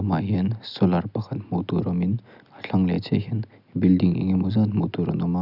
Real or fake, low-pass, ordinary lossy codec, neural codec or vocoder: real; 5.4 kHz; none; none